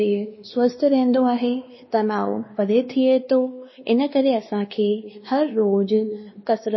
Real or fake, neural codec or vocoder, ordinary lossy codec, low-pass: fake; codec, 16 kHz, 2 kbps, X-Codec, WavLM features, trained on Multilingual LibriSpeech; MP3, 24 kbps; 7.2 kHz